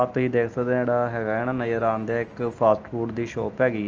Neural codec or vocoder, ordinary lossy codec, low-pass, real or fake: none; Opus, 24 kbps; 7.2 kHz; real